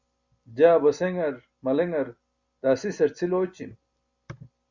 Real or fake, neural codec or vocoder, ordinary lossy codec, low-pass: real; none; Opus, 64 kbps; 7.2 kHz